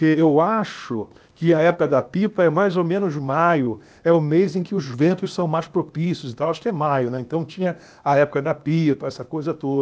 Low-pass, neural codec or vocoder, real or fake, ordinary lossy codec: none; codec, 16 kHz, 0.8 kbps, ZipCodec; fake; none